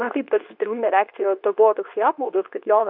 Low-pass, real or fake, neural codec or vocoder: 5.4 kHz; fake; codec, 24 kHz, 0.9 kbps, WavTokenizer, medium speech release version 2